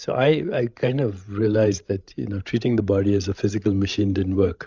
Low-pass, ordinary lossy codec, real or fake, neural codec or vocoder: 7.2 kHz; Opus, 64 kbps; fake; codec, 16 kHz, 16 kbps, FreqCodec, larger model